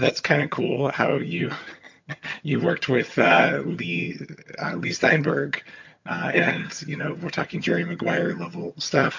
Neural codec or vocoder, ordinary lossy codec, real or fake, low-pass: vocoder, 22.05 kHz, 80 mel bands, HiFi-GAN; MP3, 48 kbps; fake; 7.2 kHz